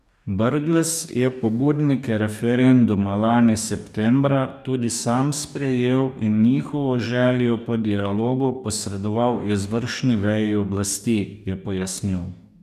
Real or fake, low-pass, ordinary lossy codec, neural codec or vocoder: fake; 14.4 kHz; none; codec, 44.1 kHz, 2.6 kbps, DAC